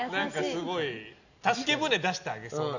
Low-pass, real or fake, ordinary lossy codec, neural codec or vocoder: 7.2 kHz; real; none; none